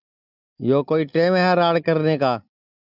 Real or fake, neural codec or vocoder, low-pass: real; none; 5.4 kHz